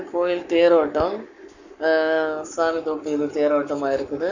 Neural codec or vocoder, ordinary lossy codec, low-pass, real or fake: codec, 44.1 kHz, 7.8 kbps, Pupu-Codec; none; 7.2 kHz; fake